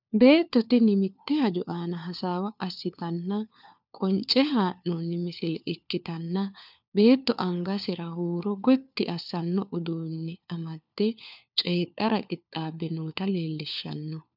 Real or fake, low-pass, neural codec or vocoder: fake; 5.4 kHz; codec, 16 kHz, 4 kbps, FunCodec, trained on LibriTTS, 50 frames a second